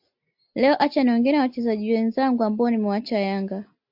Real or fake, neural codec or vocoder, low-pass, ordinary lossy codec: real; none; 5.4 kHz; AAC, 48 kbps